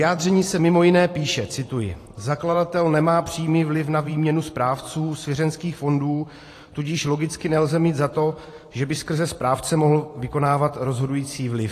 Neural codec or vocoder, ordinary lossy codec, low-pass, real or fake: vocoder, 44.1 kHz, 128 mel bands every 512 samples, BigVGAN v2; AAC, 48 kbps; 14.4 kHz; fake